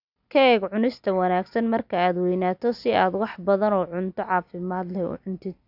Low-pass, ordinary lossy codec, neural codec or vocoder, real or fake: 5.4 kHz; none; none; real